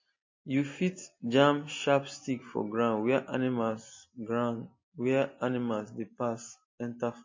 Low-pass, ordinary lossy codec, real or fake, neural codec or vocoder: 7.2 kHz; MP3, 32 kbps; real; none